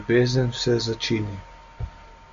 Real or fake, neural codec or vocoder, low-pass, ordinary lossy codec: real; none; 7.2 kHz; MP3, 64 kbps